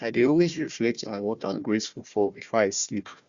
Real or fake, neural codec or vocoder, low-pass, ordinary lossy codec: fake; codec, 16 kHz, 1 kbps, FunCodec, trained on Chinese and English, 50 frames a second; 7.2 kHz; Opus, 64 kbps